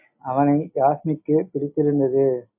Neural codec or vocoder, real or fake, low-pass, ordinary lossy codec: none; real; 3.6 kHz; MP3, 32 kbps